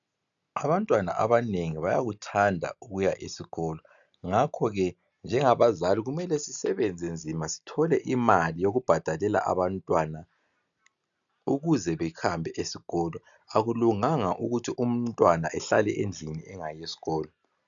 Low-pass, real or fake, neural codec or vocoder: 7.2 kHz; real; none